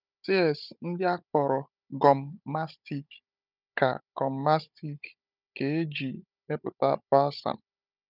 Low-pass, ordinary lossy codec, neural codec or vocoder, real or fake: 5.4 kHz; none; codec, 16 kHz, 16 kbps, FunCodec, trained on Chinese and English, 50 frames a second; fake